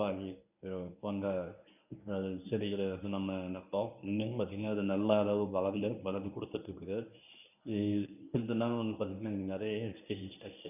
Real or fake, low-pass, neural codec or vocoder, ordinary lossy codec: fake; 3.6 kHz; codec, 24 kHz, 0.9 kbps, WavTokenizer, medium speech release version 1; none